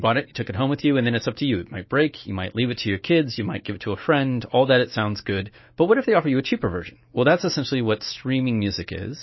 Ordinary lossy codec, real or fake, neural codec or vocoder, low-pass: MP3, 24 kbps; fake; autoencoder, 48 kHz, 128 numbers a frame, DAC-VAE, trained on Japanese speech; 7.2 kHz